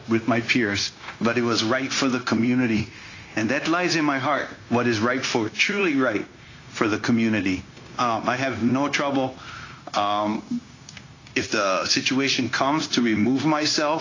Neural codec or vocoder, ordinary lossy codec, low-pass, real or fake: codec, 16 kHz, 0.9 kbps, LongCat-Audio-Codec; AAC, 32 kbps; 7.2 kHz; fake